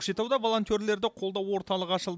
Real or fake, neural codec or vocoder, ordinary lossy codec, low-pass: real; none; none; none